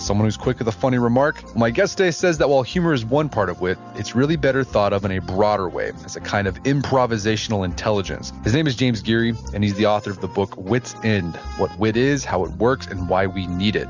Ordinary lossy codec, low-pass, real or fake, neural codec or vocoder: Opus, 64 kbps; 7.2 kHz; real; none